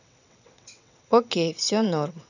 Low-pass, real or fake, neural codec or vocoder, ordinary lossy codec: 7.2 kHz; fake; vocoder, 22.05 kHz, 80 mel bands, WaveNeXt; none